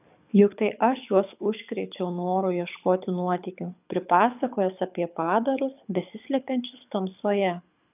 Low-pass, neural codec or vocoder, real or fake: 3.6 kHz; codec, 24 kHz, 6 kbps, HILCodec; fake